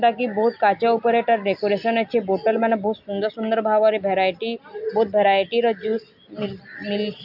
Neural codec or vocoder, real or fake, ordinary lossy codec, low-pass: none; real; MP3, 48 kbps; 5.4 kHz